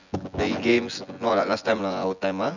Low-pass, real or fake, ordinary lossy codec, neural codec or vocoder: 7.2 kHz; fake; none; vocoder, 24 kHz, 100 mel bands, Vocos